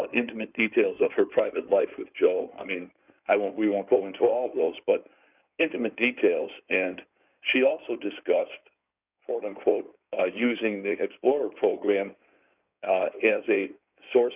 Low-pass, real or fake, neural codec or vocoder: 3.6 kHz; fake; codec, 16 kHz in and 24 kHz out, 2.2 kbps, FireRedTTS-2 codec